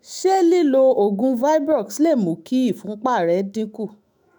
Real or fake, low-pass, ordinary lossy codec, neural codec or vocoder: fake; none; none; autoencoder, 48 kHz, 128 numbers a frame, DAC-VAE, trained on Japanese speech